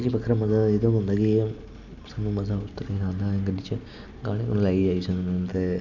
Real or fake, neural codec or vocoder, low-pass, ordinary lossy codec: real; none; 7.2 kHz; none